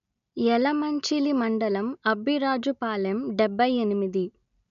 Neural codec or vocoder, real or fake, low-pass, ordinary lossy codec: none; real; 7.2 kHz; none